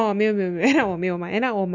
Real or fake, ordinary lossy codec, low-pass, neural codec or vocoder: real; none; 7.2 kHz; none